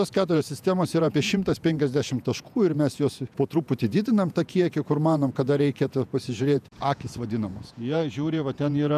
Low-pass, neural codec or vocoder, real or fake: 14.4 kHz; vocoder, 48 kHz, 128 mel bands, Vocos; fake